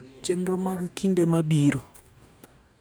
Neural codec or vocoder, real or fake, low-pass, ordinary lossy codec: codec, 44.1 kHz, 2.6 kbps, DAC; fake; none; none